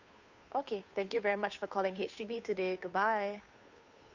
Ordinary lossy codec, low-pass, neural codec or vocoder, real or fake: none; 7.2 kHz; codec, 16 kHz, 2 kbps, FunCodec, trained on Chinese and English, 25 frames a second; fake